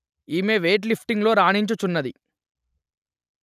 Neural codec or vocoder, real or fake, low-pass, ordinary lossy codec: none; real; 14.4 kHz; none